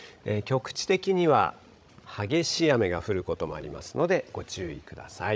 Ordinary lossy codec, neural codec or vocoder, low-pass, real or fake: none; codec, 16 kHz, 16 kbps, FreqCodec, larger model; none; fake